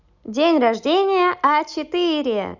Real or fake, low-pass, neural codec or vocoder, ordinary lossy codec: real; 7.2 kHz; none; none